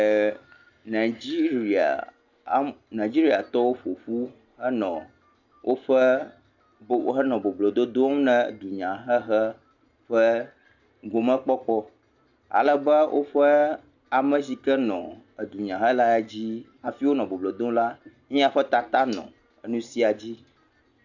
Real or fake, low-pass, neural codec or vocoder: real; 7.2 kHz; none